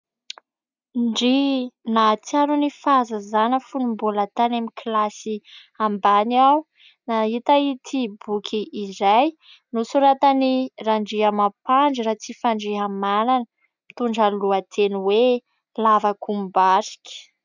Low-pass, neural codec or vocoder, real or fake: 7.2 kHz; none; real